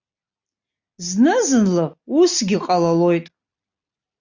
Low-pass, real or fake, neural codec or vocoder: 7.2 kHz; real; none